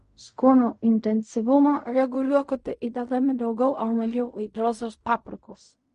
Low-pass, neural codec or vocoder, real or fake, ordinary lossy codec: 10.8 kHz; codec, 16 kHz in and 24 kHz out, 0.4 kbps, LongCat-Audio-Codec, fine tuned four codebook decoder; fake; MP3, 48 kbps